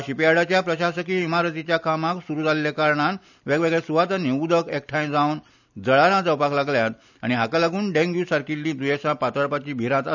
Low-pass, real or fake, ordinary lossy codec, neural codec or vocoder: 7.2 kHz; real; none; none